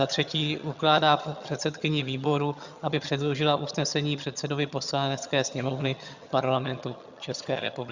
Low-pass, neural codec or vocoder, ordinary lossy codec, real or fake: 7.2 kHz; vocoder, 22.05 kHz, 80 mel bands, HiFi-GAN; Opus, 64 kbps; fake